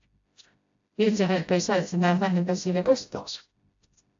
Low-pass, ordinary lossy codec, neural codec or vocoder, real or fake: 7.2 kHz; AAC, 64 kbps; codec, 16 kHz, 0.5 kbps, FreqCodec, smaller model; fake